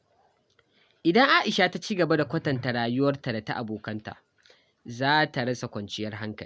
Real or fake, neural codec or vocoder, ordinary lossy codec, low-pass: real; none; none; none